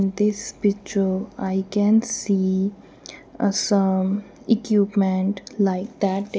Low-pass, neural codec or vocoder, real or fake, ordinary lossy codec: none; none; real; none